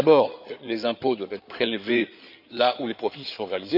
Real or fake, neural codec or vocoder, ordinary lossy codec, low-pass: fake; codec, 16 kHz in and 24 kHz out, 2.2 kbps, FireRedTTS-2 codec; none; 5.4 kHz